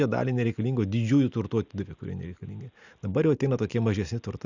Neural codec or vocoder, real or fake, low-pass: none; real; 7.2 kHz